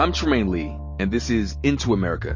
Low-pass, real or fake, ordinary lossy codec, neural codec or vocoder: 7.2 kHz; real; MP3, 32 kbps; none